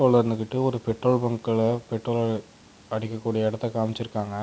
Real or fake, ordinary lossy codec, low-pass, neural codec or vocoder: real; none; none; none